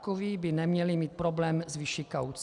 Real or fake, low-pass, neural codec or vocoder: real; 10.8 kHz; none